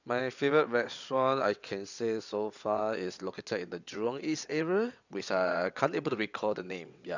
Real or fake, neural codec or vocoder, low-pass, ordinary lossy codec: fake; vocoder, 22.05 kHz, 80 mel bands, WaveNeXt; 7.2 kHz; none